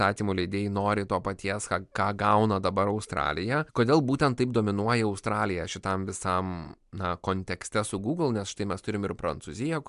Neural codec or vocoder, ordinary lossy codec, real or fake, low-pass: none; MP3, 96 kbps; real; 10.8 kHz